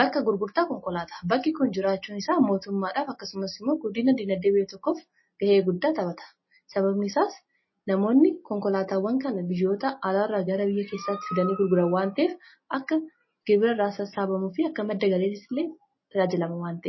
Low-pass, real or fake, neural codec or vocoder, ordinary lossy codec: 7.2 kHz; real; none; MP3, 24 kbps